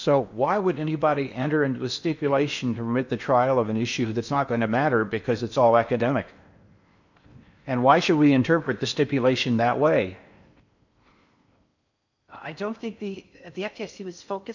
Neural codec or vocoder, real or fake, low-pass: codec, 16 kHz in and 24 kHz out, 0.8 kbps, FocalCodec, streaming, 65536 codes; fake; 7.2 kHz